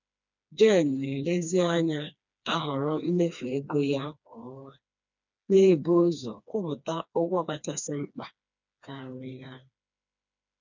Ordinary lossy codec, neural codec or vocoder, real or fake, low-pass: none; codec, 16 kHz, 2 kbps, FreqCodec, smaller model; fake; 7.2 kHz